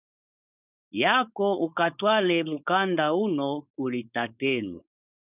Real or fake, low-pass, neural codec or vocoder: fake; 3.6 kHz; codec, 16 kHz, 4.8 kbps, FACodec